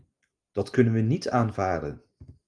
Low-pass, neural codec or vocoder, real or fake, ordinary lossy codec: 9.9 kHz; none; real; Opus, 24 kbps